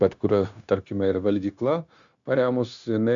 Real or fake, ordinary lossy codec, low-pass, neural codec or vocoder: fake; MP3, 64 kbps; 7.2 kHz; codec, 16 kHz, 0.9 kbps, LongCat-Audio-Codec